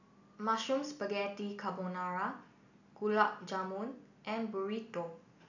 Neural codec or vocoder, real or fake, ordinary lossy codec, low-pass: none; real; none; 7.2 kHz